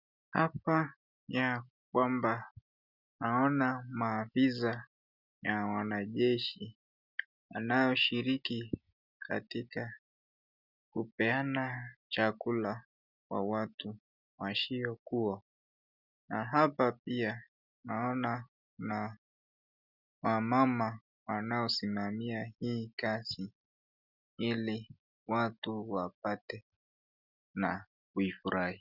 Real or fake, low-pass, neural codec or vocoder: real; 5.4 kHz; none